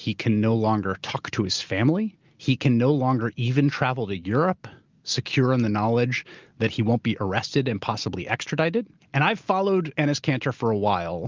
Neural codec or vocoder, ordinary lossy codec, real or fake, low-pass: none; Opus, 32 kbps; real; 7.2 kHz